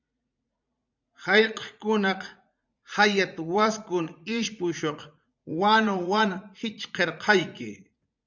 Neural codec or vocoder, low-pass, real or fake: vocoder, 44.1 kHz, 80 mel bands, Vocos; 7.2 kHz; fake